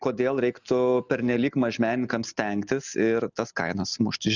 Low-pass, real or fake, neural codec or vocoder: 7.2 kHz; real; none